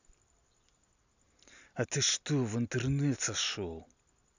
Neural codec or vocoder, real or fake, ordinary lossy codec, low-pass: none; real; none; 7.2 kHz